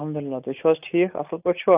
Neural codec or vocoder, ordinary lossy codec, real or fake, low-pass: none; AAC, 32 kbps; real; 3.6 kHz